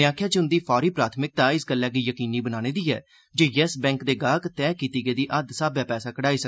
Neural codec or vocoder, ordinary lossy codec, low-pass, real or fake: none; none; none; real